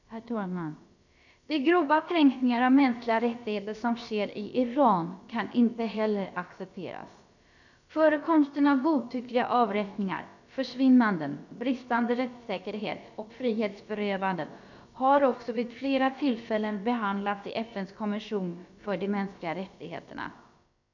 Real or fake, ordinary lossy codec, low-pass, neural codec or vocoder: fake; none; 7.2 kHz; codec, 16 kHz, about 1 kbps, DyCAST, with the encoder's durations